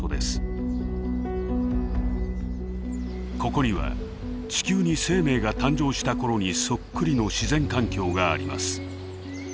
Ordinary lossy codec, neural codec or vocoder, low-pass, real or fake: none; none; none; real